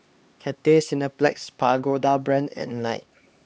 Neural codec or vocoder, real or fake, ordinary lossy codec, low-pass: codec, 16 kHz, 2 kbps, X-Codec, HuBERT features, trained on LibriSpeech; fake; none; none